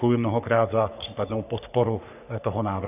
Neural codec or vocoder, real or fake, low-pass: codec, 44.1 kHz, 3.4 kbps, Pupu-Codec; fake; 3.6 kHz